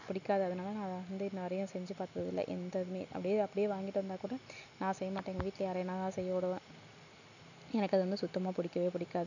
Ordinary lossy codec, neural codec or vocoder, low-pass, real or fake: none; none; 7.2 kHz; real